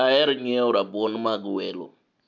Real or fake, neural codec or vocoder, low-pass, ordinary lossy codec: real; none; 7.2 kHz; none